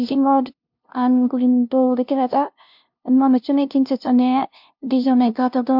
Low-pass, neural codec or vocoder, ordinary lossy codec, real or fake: 5.4 kHz; codec, 16 kHz, 0.5 kbps, FunCodec, trained on LibriTTS, 25 frames a second; MP3, 48 kbps; fake